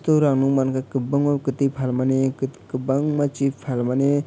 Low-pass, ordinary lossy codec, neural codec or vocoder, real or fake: none; none; none; real